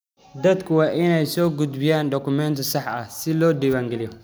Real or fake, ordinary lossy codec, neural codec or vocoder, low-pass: real; none; none; none